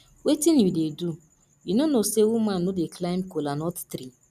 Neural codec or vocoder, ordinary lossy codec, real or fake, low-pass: none; none; real; 14.4 kHz